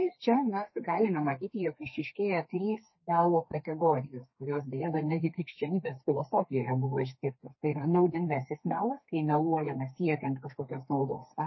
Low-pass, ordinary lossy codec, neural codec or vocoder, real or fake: 7.2 kHz; MP3, 24 kbps; codec, 32 kHz, 1.9 kbps, SNAC; fake